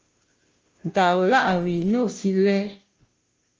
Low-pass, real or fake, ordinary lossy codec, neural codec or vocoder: 7.2 kHz; fake; Opus, 24 kbps; codec, 16 kHz, 0.5 kbps, FunCodec, trained on Chinese and English, 25 frames a second